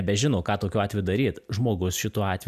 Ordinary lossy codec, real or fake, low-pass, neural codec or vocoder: AAC, 96 kbps; real; 14.4 kHz; none